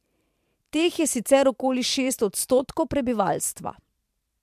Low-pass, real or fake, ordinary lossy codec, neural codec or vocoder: 14.4 kHz; fake; MP3, 96 kbps; vocoder, 44.1 kHz, 128 mel bands every 512 samples, BigVGAN v2